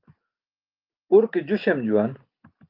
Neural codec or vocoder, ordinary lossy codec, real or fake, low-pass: none; Opus, 24 kbps; real; 5.4 kHz